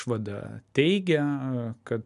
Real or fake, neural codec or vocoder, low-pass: real; none; 10.8 kHz